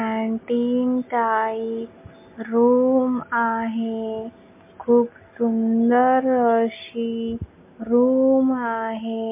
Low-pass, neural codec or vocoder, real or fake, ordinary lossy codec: 3.6 kHz; none; real; MP3, 24 kbps